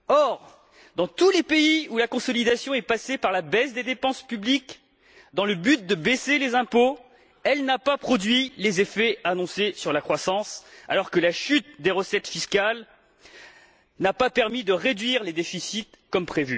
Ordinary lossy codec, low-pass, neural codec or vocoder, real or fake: none; none; none; real